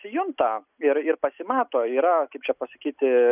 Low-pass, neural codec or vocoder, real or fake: 3.6 kHz; none; real